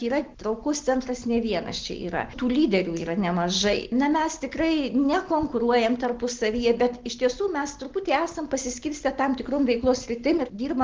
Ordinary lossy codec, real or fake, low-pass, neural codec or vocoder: Opus, 16 kbps; real; 7.2 kHz; none